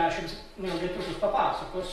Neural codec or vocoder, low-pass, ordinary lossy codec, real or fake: vocoder, 44.1 kHz, 128 mel bands every 256 samples, BigVGAN v2; 19.8 kHz; AAC, 32 kbps; fake